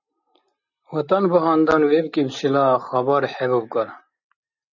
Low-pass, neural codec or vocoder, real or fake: 7.2 kHz; none; real